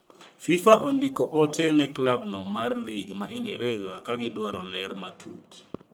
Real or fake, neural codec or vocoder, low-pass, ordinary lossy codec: fake; codec, 44.1 kHz, 1.7 kbps, Pupu-Codec; none; none